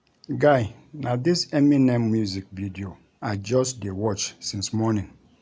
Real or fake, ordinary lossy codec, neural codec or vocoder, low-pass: real; none; none; none